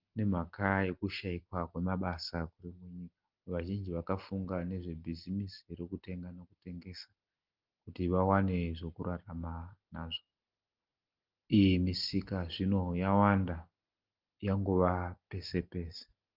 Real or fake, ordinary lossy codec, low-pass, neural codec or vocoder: real; Opus, 24 kbps; 5.4 kHz; none